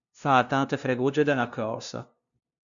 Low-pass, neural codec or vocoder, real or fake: 7.2 kHz; codec, 16 kHz, 0.5 kbps, FunCodec, trained on LibriTTS, 25 frames a second; fake